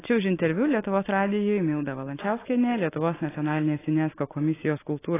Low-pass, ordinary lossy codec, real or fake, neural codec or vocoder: 3.6 kHz; AAC, 16 kbps; real; none